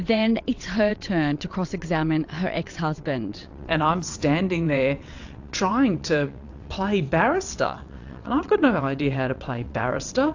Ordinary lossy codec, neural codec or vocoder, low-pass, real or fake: AAC, 48 kbps; vocoder, 22.05 kHz, 80 mel bands, WaveNeXt; 7.2 kHz; fake